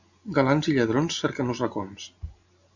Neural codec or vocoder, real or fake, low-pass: none; real; 7.2 kHz